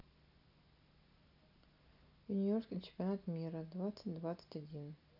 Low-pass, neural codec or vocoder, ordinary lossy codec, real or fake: 5.4 kHz; none; none; real